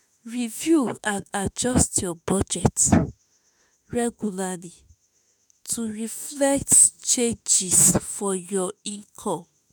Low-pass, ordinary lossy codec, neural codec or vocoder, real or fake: none; none; autoencoder, 48 kHz, 32 numbers a frame, DAC-VAE, trained on Japanese speech; fake